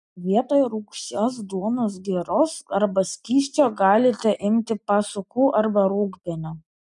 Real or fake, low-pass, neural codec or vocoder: real; 10.8 kHz; none